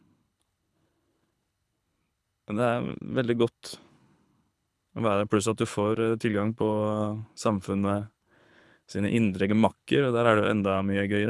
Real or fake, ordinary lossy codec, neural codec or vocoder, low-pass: fake; none; codec, 24 kHz, 6 kbps, HILCodec; none